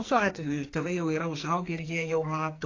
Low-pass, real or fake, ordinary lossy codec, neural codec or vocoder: 7.2 kHz; fake; AAC, 32 kbps; codec, 44.1 kHz, 2.6 kbps, SNAC